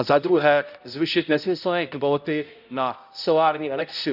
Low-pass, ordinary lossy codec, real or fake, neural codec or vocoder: 5.4 kHz; none; fake; codec, 16 kHz, 0.5 kbps, X-Codec, HuBERT features, trained on balanced general audio